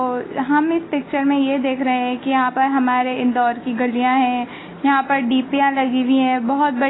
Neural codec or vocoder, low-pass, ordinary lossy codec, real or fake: none; 7.2 kHz; AAC, 16 kbps; real